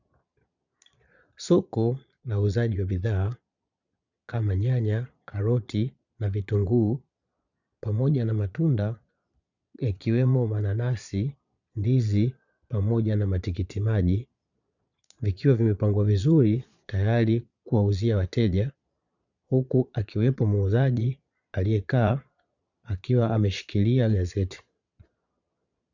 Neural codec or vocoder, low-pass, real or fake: vocoder, 22.05 kHz, 80 mel bands, Vocos; 7.2 kHz; fake